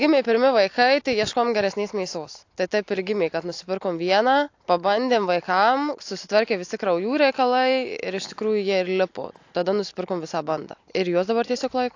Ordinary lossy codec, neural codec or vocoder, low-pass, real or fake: AAC, 48 kbps; none; 7.2 kHz; real